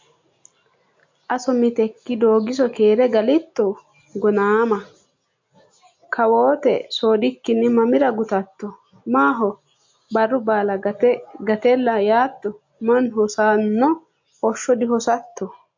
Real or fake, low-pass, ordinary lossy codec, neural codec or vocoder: real; 7.2 kHz; MP3, 48 kbps; none